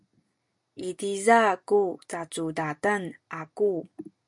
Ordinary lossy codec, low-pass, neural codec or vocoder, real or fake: MP3, 64 kbps; 10.8 kHz; none; real